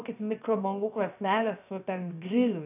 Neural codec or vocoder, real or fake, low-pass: codec, 16 kHz, 0.7 kbps, FocalCodec; fake; 3.6 kHz